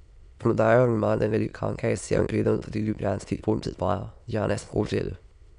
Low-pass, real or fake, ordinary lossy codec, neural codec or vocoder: 9.9 kHz; fake; none; autoencoder, 22.05 kHz, a latent of 192 numbers a frame, VITS, trained on many speakers